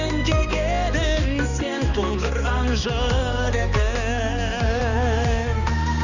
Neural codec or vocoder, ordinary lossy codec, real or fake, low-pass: codec, 16 kHz, 2 kbps, X-Codec, HuBERT features, trained on balanced general audio; none; fake; 7.2 kHz